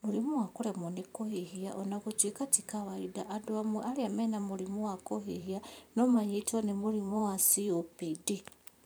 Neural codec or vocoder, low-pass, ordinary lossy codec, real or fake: vocoder, 44.1 kHz, 128 mel bands every 512 samples, BigVGAN v2; none; none; fake